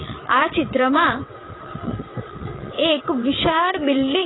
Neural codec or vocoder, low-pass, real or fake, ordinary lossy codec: vocoder, 22.05 kHz, 80 mel bands, WaveNeXt; 7.2 kHz; fake; AAC, 16 kbps